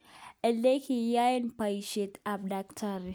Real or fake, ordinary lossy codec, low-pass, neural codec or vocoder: real; none; none; none